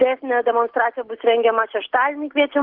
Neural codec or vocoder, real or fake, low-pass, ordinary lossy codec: none; real; 5.4 kHz; Opus, 16 kbps